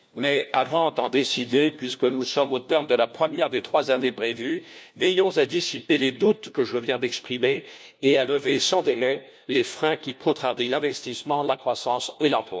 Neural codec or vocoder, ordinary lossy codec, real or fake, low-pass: codec, 16 kHz, 1 kbps, FunCodec, trained on LibriTTS, 50 frames a second; none; fake; none